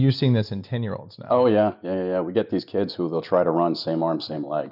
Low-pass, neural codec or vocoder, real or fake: 5.4 kHz; none; real